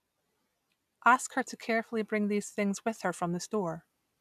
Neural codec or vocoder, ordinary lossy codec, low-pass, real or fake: none; none; 14.4 kHz; real